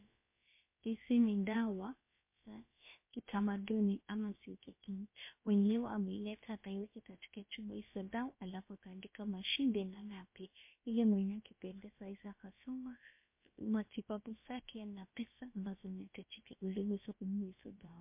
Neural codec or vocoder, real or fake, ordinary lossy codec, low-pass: codec, 16 kHz, about 1 kbps, DyCAST, with the encoder's durations; fake; MP3, 24 kbps; 3.6 kHz